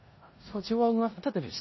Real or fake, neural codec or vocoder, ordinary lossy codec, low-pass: fake; codec, 16 kHz in and 24 kHz out, 0.4 kbps, LongCat-Audio-Codec, four codebook decoder; MP3, 24 kbps; 7.2 kHz